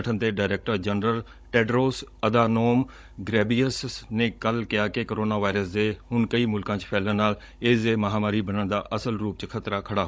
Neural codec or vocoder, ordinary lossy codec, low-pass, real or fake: codec, 16 kHz, 16 kbps, FunCodec, trained on Chinese and English, 50 frames a second; none; none; fake